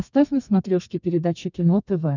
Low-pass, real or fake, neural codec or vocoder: 7.2 kHz; fake; codec, 32 kHz, 1.9 kbps, SNAC